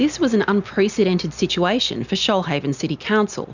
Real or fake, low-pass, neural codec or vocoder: real; 7.2 kHz; none